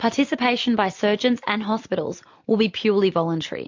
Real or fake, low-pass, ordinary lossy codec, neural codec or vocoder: real; 7.2 kHz; MP3, 48 kbps; none